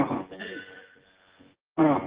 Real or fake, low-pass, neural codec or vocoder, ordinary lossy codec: fake; 3.6 kHz; vocoder, 24 kHz, 100 mel bands, Vocos; Opus, 16 kbps